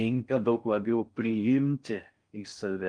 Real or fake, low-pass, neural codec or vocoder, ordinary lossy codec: fake; 9.9 kHz; codec, 16 kHz in and 24 kHz out, 0.6 kbps, FocalCodec, streaming, 4096 codes; Opus, 32 kbps